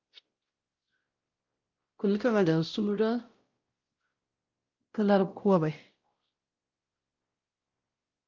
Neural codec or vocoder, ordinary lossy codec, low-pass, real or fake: codec, 16 kHz, 0.5 kbps, X-Codec, WavLM features, trained on Multilingual LibriSpeech; Opus, 32 kbps; 7.2 kHz; fake